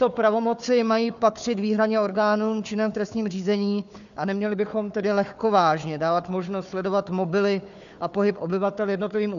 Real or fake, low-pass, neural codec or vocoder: fake; 7.2 kHz; codec, 16 kHz, 4 kbps, FunCodec, trained on Chinese and English, 50 frames a second